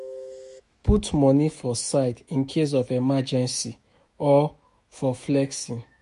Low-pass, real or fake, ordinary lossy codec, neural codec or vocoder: 14.4 kHz; fake; MP3, 48 kbps; autoencoder, 48 kHz, 128 numbers a frame, DAC-VAE, trained on Japanese speech